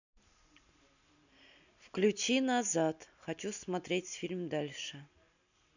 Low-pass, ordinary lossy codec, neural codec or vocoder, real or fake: 7.2 kHz; none; none; real